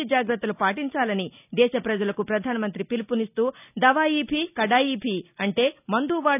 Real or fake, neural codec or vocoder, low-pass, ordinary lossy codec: real; none; 3.6 kHz; none